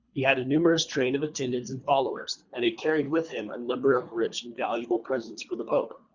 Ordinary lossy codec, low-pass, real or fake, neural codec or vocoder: Opus, 64 kbps; 7.2 kHz; fake; codec, 24 kHz, 3 kbps, HILCodec